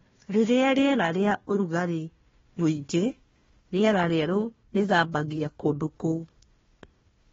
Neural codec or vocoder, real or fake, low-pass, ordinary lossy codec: codec, 16 kHz, 1 kbps, FunCodec, trained on Chinese and English, 50 frames a second; fake; 7.2 kHz; AAC, 24 kbps